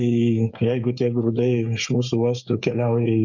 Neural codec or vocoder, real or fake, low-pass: codec, 16 kHz, 8 kbps, FreqCodec, smaller model; fake; 7.2 kHz